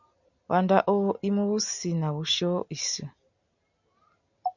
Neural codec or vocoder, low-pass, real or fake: none; 7.2 kHz; real